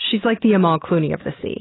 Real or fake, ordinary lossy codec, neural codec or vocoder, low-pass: real; AAC, 16 kbps; none; 7.2 kHz